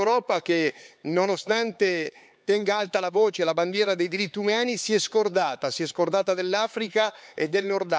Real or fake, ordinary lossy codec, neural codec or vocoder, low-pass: fake; none; codec, 16 kHz, 4 kbps, X-Codec, HuBERT features, trained on LibriSpeech; none